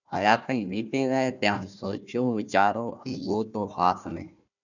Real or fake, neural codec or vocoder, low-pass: fake; codec, 16 kHz, 1 kbps, FunCodec, trained on Chinese and English, 50 frames a second; 7.2 kHz